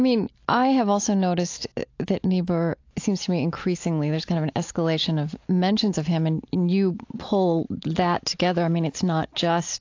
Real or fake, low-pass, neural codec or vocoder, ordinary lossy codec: real; 7.2 kHz; none; AAC, 48 kbps